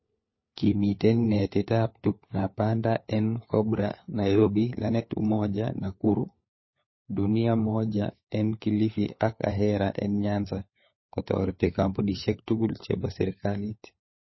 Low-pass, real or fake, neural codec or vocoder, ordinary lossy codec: 7.2 kHz; fake; codec, 16 kHz, 4 kbps, FunCodec, trained on LibriTTS, 50 frames a second; MP3, 24 kbps